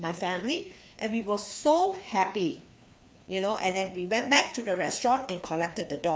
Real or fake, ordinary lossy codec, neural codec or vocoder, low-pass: fake; none; codec, 16 kHz, 2 kbps, FreqCodec, larger model; none